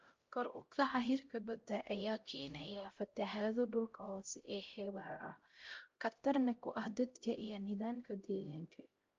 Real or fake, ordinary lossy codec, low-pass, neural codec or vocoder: fake; Opus, 24 kbps; 7.2 kHz; codec, 16 kHz, 0.5 kbps, X-Codec, HuBERT features, trained on LibriSpeech